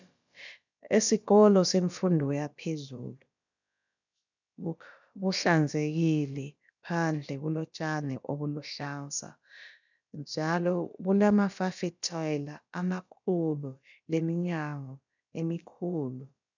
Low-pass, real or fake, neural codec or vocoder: 7.2 kHz; fake; codec, 16 kHz, about 1 kbps, DyCAST, with the encoder's durations